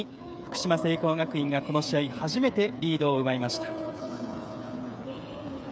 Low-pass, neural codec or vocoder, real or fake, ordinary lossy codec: none; codec, 16 kHz, 8 kbps, FreqCodec, smaller model; fake; none